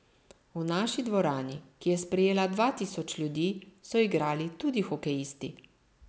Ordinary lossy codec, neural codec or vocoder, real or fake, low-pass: none; none; real; none